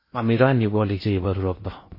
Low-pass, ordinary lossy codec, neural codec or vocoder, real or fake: 5.4 kHz; MP3, 24 kbps; codec, 16 kHz in and 24 kHz out, 0.6 kbps, FocalCodec, streaming, 2048 codes; fake